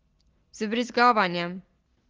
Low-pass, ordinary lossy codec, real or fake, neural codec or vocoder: 7.2 kHz; Opus, 16 kbps; real; none